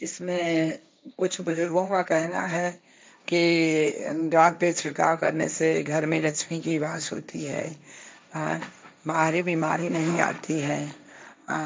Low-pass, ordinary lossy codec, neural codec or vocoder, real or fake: none; none; codec, 16 kHz, 1.1 kbps, Voila-Tokenizer; fake